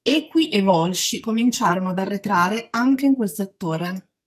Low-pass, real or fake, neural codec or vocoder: 14.4 kHz; fake; codec, 44.1 kHz, 2.6 kbps, SNAC